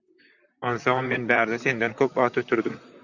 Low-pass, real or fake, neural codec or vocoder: 7.2 kHz; fake; vocoder, 44.1 kHz, 128 mel bands, Pupu-Vocoder